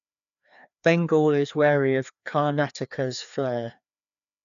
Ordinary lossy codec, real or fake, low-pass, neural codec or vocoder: none; fake; 7.2 kHz; codec, 16 kHz, 2 kbps, FreqCodec, larger model